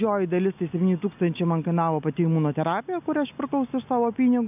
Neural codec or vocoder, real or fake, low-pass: none; real; 3.6 kHz